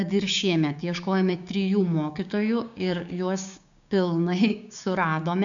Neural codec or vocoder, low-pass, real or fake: codec, 16 kHz, 6 kbps, DAC; 7.2 kHz; fake